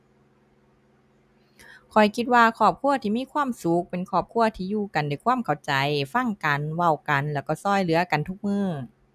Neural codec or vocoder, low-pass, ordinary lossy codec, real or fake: none; 14.4 kHz; none; real